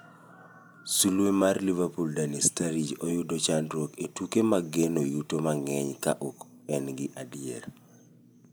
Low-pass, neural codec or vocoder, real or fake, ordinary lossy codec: none; none; real; none